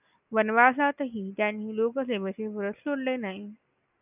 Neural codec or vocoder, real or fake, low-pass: none; real; 3.6 kHz